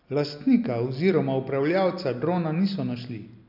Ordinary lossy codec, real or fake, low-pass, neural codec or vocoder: none; real; 5.4 kHz; none